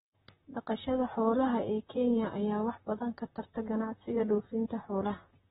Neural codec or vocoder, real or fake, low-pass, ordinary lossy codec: vocoder, 44.1 kHz, 128 mel bands, Pupu-Vocoder; fake; 19.8 kHz; AAC, 16 kbps